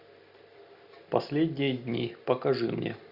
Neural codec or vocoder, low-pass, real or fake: none; 5.4 kHz; real